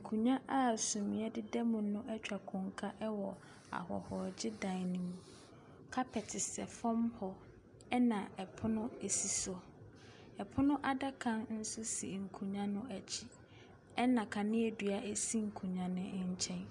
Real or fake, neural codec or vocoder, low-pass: real; none; 10.8 kHz